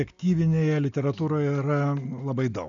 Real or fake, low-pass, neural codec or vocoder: real; 7.2 kHz; none